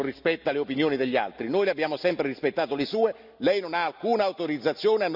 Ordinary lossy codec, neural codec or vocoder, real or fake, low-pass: none; none; real; 5.4 kHz